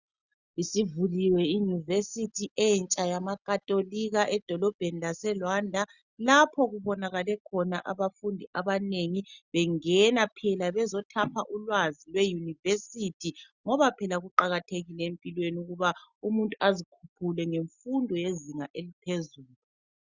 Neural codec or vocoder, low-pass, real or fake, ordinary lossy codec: none; 7.2 kHz; real; Opus, 64 kbps